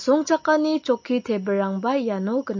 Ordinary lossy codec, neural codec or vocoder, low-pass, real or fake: MP3, 32 kbps; none; 7.2 kHz; real